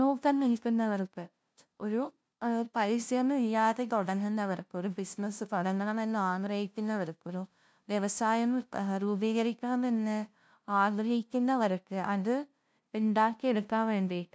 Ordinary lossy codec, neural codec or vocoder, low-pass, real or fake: none; codec, 16 kHz, 0.5 kbps, FunCodec, trained on LibriTTS, 25 frames a second; none; fake